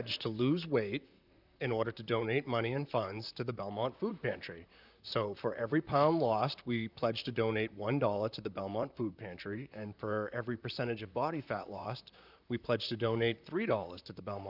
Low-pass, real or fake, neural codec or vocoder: 5.4 kHz; fake; vocoder, 44.1 kHz, 128 mel bands, Pupu-Vocoder